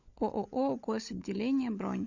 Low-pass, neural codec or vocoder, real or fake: 7.2 kHz; codec, 16 kHz, 16 kbps, FunCodec, trained on LibriTTS, 50 frames a second; fake